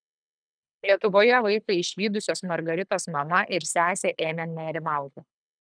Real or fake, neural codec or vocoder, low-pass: fake; codec, 24 kHz, 6 kbps, HILCodec; 9.9 kHz